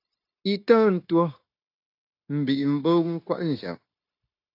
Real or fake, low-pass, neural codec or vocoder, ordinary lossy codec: fake; 5.4 kHz; codec, 16 kHz, 0.9 kbps, LongCat-Audio-Codec; AAC, 32 kbps